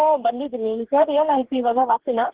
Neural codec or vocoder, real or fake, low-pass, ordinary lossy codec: codec, 44.1 kHz, 2.6 kbps, DAC; fake; 3.6 kHz; Opus, 16 kbps